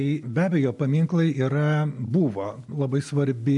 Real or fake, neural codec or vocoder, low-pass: real; none; 10.8 kHz